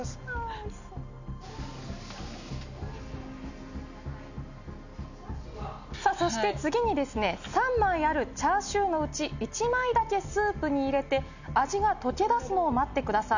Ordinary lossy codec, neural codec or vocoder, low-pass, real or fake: none; none; 7.2 kHz; real